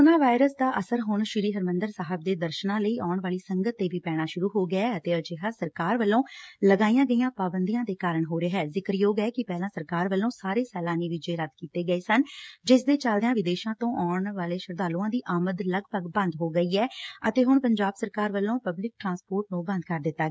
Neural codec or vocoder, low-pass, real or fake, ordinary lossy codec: codec, 16 kHz, 16 kbps, FreqCodec, smaller model; none; fake; none